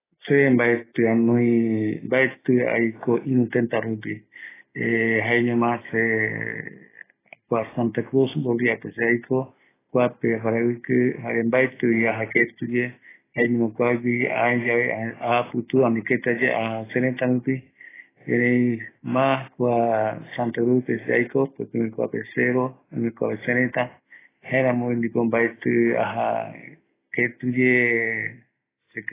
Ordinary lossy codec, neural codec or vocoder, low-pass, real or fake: AAC, 16 kbps; none; 3.6 kHz; real